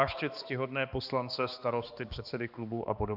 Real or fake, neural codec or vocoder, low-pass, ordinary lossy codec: fake; codec, 16 kHz, 2 kbps, X-Codec, HuBERT features, trained on balanced general audio; 5.4 kHz; AAC, 48 kbps